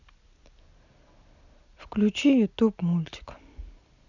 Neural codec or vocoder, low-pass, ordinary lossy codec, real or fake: none; 7.2 kHz; none; real